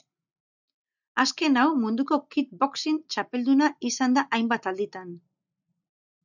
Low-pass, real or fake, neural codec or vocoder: 7.2 kHz; real; none